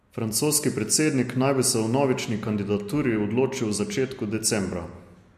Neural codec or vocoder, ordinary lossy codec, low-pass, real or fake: none; MP3, 64 kbps; 14.4 kHz; real